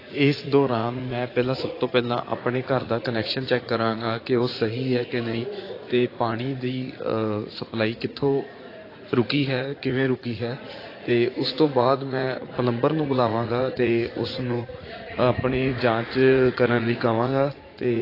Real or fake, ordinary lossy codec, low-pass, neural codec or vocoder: fake; AAC, 24 kbps; 5.4 kHz; vocoder, 44.1 kHz, 80 mel bands, Vocos